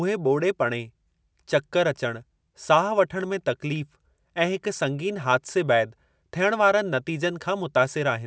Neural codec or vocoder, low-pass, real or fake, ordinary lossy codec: none; none; real; none